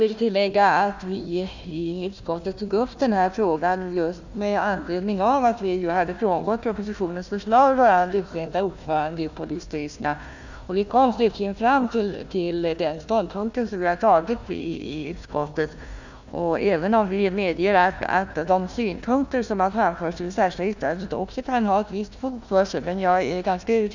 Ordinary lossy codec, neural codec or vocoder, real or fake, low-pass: none; codec, 16 kHz, 1 kbps, FunCodec, trained on Chinese and English, 50 frames a second; fake; 7.2 kHz